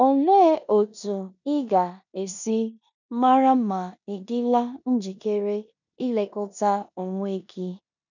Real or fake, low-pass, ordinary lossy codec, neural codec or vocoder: fake; 7.2 kHz; none; codec, 16 kHz in and 24 kHz out, 0.9 kbps, LongCat-Audio-Codec, four codebook decoder